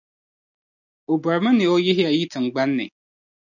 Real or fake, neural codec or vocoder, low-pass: real; none; 7.2 kHz